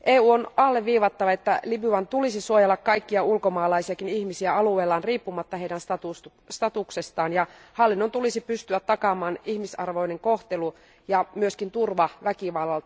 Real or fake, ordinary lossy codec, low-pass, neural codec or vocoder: real; none; none; none